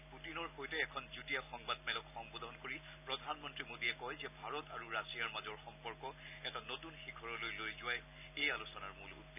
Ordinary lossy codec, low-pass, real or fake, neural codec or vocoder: none; 3.6 kHz; real; none